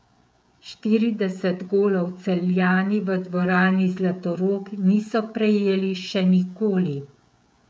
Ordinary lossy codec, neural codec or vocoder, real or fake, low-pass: none; codec, 16 kHz, 16 kbps, FreqCodec, smaller model; fake; none